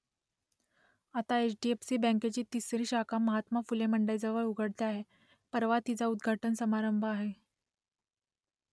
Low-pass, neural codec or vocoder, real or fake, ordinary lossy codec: none; none; real; none